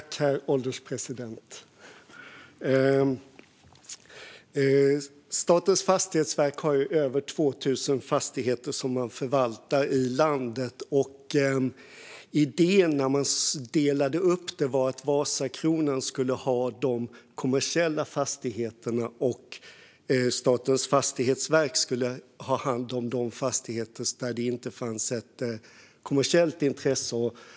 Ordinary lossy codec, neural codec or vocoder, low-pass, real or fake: none; none; none; real